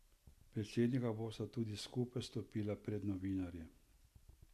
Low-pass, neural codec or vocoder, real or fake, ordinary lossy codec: 14.4 kHz; none; real; MP3, 96 kbps